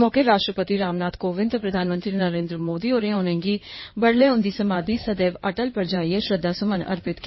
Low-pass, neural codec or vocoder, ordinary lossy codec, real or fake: 7.2 kHz; codec, 16 kHz in and 24 kHz out, 2.2 kbps, FireRedTTS-2 codec; MP3, 24 kbps; fake